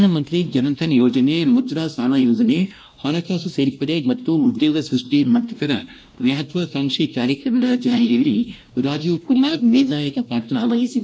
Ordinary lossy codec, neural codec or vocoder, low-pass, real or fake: none; codec, 16 kHz, 1 kbps, X-Codec, WavLM features, trained on Multilingual LibriSpeech; none; fake